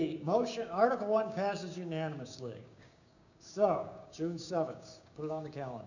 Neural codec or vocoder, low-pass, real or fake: codec, 16 kHz, 6 kbps, DAC; 7.2 kHz; fake